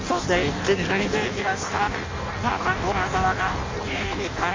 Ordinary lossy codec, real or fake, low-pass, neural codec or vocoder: MP3, 32 kbps; fake; 7.2 kHz; codec, 16 kHz in and 24 kHz out, 0.6 kbps, FireRedTTS-2 codec